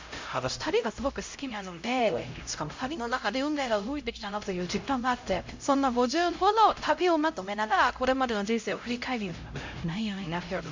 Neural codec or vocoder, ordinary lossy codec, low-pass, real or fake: codec, 16 kHz, 0.5 kbps, X-Codec, HuBERT features, trained on LibriSpeech; MP3, 48 kbps; 7.2 kHz; fake